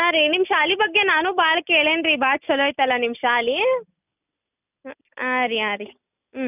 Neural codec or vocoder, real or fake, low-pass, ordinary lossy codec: none; real; 3.6 kHz; none